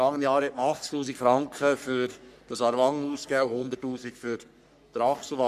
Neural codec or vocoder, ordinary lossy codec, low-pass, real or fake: codec, 44.1 kHz, 3.4 kbps, Pupu-Codec; none; 14.4 kHz; fake